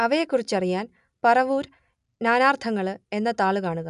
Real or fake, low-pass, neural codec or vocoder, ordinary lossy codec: real; 10.8 kHz; none; none